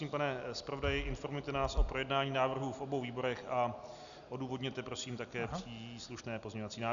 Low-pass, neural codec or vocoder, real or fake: 7.2 kHz; none; real